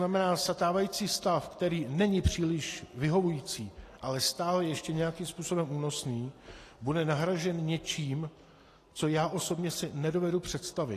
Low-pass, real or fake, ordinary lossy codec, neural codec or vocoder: 14.4 kHz; real; AAC, 48 kbps; none